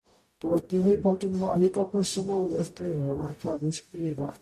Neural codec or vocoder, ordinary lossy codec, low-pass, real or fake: codec, 44.1 kHz, 0.9 kbps, DAC; MP3, 64 kbps; 14.4 kHz; fake